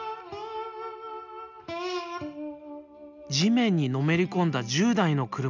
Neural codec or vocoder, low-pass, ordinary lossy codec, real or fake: none; 7.2 kHz; none; real